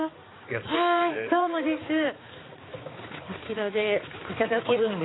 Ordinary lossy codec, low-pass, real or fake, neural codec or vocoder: AAC, 16 kbps; 7.2 kHz; fake; codec, 16 kHz, 4 kbps, X-Codec, HuBERT features, trained on balanced general audio